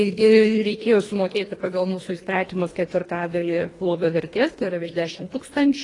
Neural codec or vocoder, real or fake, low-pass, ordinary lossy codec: codec, 24 kHz, 1.5 kbps, HILCodec; fake; 10.8 kHz; AAC, 32 kbps